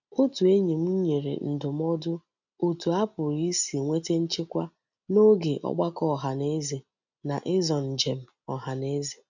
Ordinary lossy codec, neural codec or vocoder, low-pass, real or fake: none; none; 7.2 kHz; real